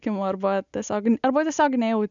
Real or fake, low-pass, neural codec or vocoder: real; 7.2 kHz; none